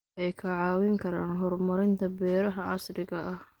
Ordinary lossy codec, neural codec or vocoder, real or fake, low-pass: Opus, 24 kbps; none; real; 19.8 kHz